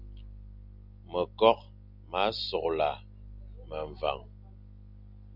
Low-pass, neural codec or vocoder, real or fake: 5.4 kHz; none; real